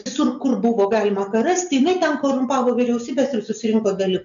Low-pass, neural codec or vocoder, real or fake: 7.2 kHz; none; real